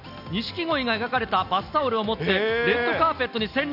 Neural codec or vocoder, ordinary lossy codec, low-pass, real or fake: none; none; 5.4 kHz; real